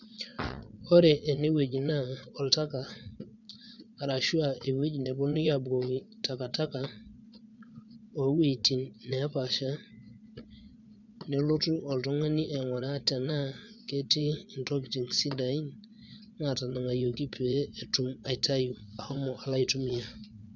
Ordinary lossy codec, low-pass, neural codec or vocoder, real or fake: none; 7.2 kHz; vocoder, 22.05 kHz, 80 mel bands, Vocos; fake